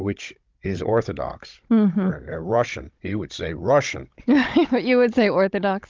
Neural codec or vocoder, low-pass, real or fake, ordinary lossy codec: codec, 16 kHz, 16 kbps, FunCodec, trained on Chinese and English, 50 frames a second; 7.2 kHz; fake; Opus, 32 kbps